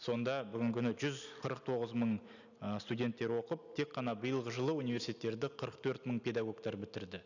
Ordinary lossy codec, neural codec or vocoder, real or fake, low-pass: none; none; real; 7.2 kHz